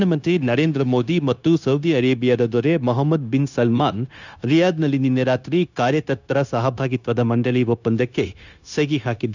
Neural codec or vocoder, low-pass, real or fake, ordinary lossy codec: codec, 16 kHz, 0.9 kbps, LongCat-Audio-Codec; 7.2 kHz; fake; none